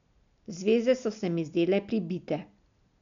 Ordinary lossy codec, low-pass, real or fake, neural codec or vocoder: none; 7.2 kHz; real; none